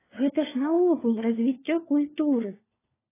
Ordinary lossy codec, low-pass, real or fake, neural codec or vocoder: AAC, 16 kbps; 3.6 kHz; fake; codec, 16 kHz, 4 kbps, FunCodec, trained on LibriTTS, 50 frames a second